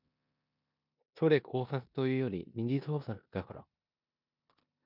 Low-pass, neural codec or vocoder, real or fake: 5.4 kHz; codec, 16 kHz in and 24 kHz out, 0.9 kbps, LongCat-Audio-Codec, four codebook decoder; fake